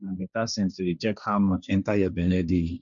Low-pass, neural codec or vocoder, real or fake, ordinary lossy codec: 7.2 kHz; codec, 16 kHz, 1.1 kbps, Voila-Tokenizer; fake; none